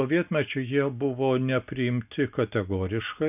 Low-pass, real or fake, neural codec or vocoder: 3.6 kHz; real; none